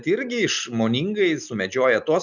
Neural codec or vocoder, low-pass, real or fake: none; 7.2 kHz; real